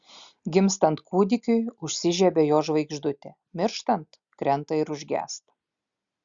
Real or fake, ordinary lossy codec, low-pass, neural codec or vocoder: real; Opus, 64 kbps; 7.2 kHz; none